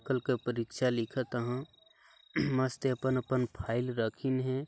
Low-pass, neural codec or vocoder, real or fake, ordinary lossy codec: none; none; real; none